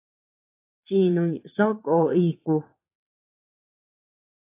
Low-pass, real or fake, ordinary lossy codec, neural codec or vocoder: 3.6 kHz; fake; AAC, 24 kbps; vocoder, 22.05 kHz, 80 mel bands, Vocos